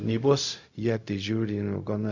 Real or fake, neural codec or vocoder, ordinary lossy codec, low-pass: fake; codec, 16 kHz, 0.4 kbps, LongCat-Audio-Codec; MP3, 64 kbps; 7.2 kHz